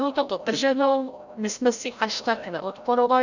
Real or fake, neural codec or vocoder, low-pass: fake; codec, 16 kHz, 0.5 kbps, FreqCodec, larger model; 7.2 kHz